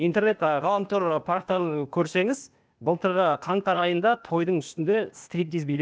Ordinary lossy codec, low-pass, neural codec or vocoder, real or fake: none; none; codec, 16 kHz, 0.8 kbps, ZipCodec; fake